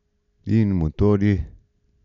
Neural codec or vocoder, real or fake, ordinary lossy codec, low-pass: none; real; none; 7.2 kHz